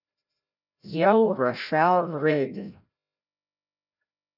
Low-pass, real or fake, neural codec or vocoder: 5.4 kHz; fake; codec, 16 kHz, 0.5 kbps, FreqCodec, larger model